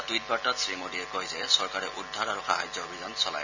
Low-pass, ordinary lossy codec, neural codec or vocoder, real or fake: 7.2 kHz; none; none; real